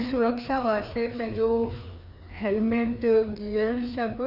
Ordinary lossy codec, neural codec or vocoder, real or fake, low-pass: none; codec, 16 kHz, 2 kbps, FreqCodec, larger model; fake; 5.4 kHz